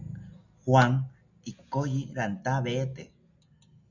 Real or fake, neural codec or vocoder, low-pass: real; none; 7.2 kHz